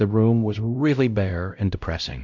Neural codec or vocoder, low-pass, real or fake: codec, 16 kHz, 0.5 kbps, X-Codec, WavLM features, trained on Multilingual LibriSpeech; 7.2 kHz; fake